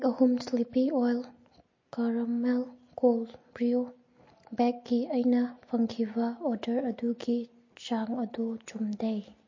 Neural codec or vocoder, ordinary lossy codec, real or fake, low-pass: none; MP3, 32 kbps; real; 7.2 kHz